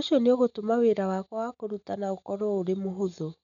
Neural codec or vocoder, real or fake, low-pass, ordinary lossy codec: none; real; 7.2 kHz; none